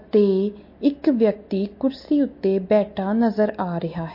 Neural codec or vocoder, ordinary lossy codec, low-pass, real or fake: none; MP3, 32 kbps; 5.4 kHz; real